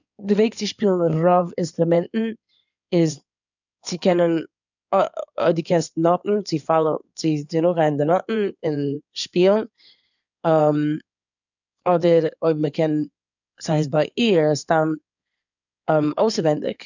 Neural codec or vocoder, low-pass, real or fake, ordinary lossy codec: codec, 16 kHz in and 24 kHz out, 2.2 kbps, FireRedTTS-2 codec; 7.2 kHz; fake; none